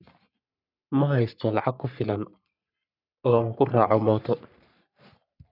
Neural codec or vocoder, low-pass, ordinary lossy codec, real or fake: codec, 44.1 kHz, 3.4 kbps, Pupu-Codec; 5.4 kHz; none; fake